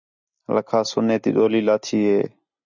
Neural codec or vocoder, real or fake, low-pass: none; real; 7.2 kHz